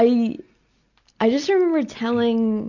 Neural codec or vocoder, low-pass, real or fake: none; 7.2 kHz; real